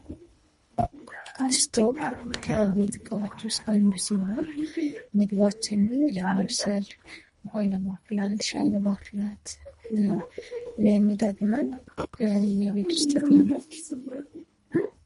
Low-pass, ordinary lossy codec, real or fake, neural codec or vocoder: 10.8 kHz; MP3, 48 kbps; fake; codec, 24 kHz, 1.5 kbps, HILCodec